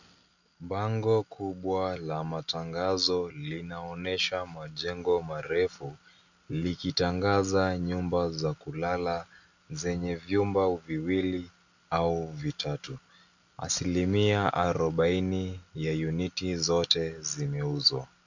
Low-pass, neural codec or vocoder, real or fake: 7.2 kHz; none; real